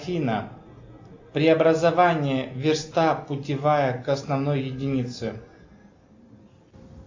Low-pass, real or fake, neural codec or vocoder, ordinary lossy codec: 7.2 kHz; real; none; AAC, 48 kbps